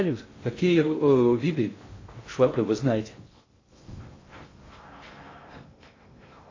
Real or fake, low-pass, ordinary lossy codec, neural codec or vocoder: fake; 7.2 kHz; AAC, 32 kbps; codec, 16 kHz in and 24 kHz out, 0.6 kbps, FocalCodec, streaming, 2048 codes